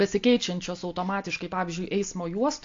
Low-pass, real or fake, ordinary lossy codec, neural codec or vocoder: 7.2 kHz; real; AAC, 48 kbps; none